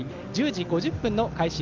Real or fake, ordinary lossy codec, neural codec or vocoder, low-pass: real; Opus, 24 kbps; none; 7.2 kHz